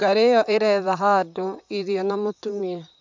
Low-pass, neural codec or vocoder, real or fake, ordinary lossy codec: 7.2 kHz; vocoder, 44.1 kHz, 128 mel bands, Pupu-Vocoder; fake; MP3, 64 kbps